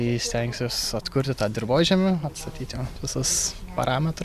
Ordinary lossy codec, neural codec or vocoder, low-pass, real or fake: Opus, 64 kbps; none; 14.4 kHz; real